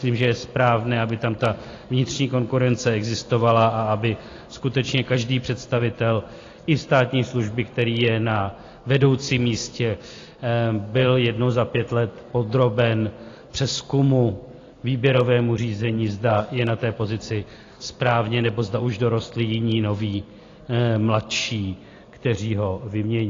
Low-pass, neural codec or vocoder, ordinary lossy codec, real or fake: 7.2 kHz; none; AAC, 32 kbps; real